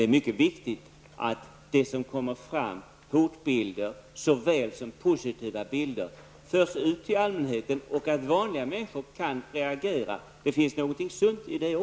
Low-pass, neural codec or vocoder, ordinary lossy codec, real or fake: none; none; none; real